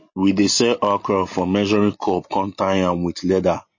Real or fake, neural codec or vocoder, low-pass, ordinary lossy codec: real; none; 7.2 kHz; MP3, 32 kbps